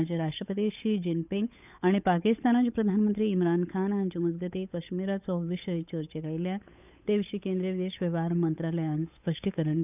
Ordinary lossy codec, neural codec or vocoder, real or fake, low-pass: none; codec, 16 kHz, 8 kbps, FunCodec, trained on Chinese and English, 25 frames a second; fake; 3.6 kHz